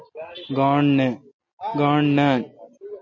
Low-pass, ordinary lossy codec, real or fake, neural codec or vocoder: 7.2 kHz; MP3, 32 kbps; real; none